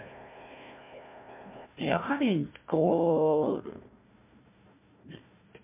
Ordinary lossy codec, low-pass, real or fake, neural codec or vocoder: MP3, 32 kbps; 3.6 kHz; fake; codec, 16 kHz, 1 kbps, FunCodec, trained on Chinese and English, 50 frames a second